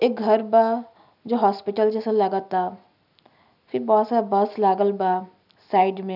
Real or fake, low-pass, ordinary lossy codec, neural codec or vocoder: real; 5.4 kHz; none; none